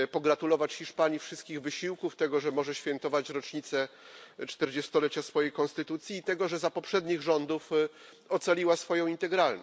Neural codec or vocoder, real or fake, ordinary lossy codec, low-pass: none; real; none; none